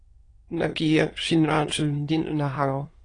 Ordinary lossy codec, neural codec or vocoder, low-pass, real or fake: AAC, 32 kbps; autoencoder, 22.05 kHz, a latent of 192 numbers a frame, VITS, trained on many speakers; 9.9 kHz; fake